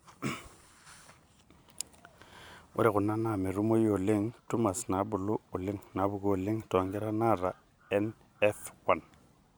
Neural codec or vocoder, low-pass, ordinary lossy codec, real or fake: vocoder, 44.1 kHz, 128 mel bands every 512 samples, BigVGAN v2; none; none; fake